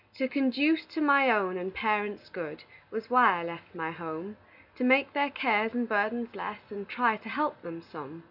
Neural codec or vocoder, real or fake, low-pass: none; real; 5.4 kHz